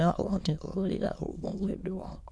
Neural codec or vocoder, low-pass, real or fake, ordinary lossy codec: autoencoder, 22.05 kHz, a latent of 192 numbers a frame, VITS, trained on many speakers; none; fake; none